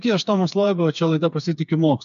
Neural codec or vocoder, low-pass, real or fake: codec, 16 kHz, 4 kbps, FreqCodec, smaller model; 7.2 kHz; fake